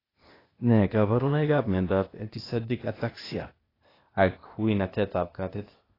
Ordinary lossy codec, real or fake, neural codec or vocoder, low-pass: AAC, 24 kbps; fake; codec, 16 kHz, 0.8 kbps, ZipCodec; 5.4 kHz